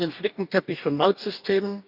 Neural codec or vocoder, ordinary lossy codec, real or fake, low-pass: codec, 44.1 kHz, 2.6 kbps, DAC; none; fake; 5.4 kHz